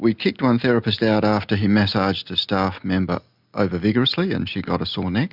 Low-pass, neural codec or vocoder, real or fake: 5.4 kHz; none; real